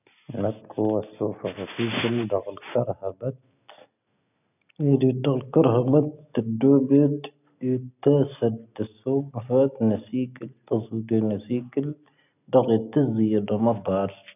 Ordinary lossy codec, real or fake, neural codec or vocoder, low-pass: none; real; none; 3.6 kHz